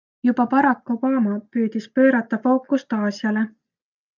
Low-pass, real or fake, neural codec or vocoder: 7.2 kHz; real; none